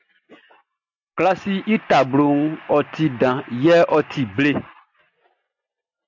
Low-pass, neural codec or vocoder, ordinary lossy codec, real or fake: 7.2 kHz; none; AAC, 48 kbps; real